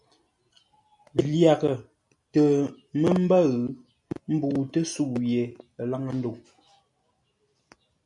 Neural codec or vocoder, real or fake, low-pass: none; real; 10.8 kHz